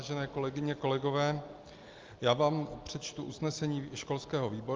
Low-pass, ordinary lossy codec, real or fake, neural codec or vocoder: 7.2 kHz; Opus, 32 kbps; real; none